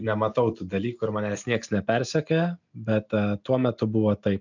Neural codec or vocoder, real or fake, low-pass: none; real; 7.2 kHz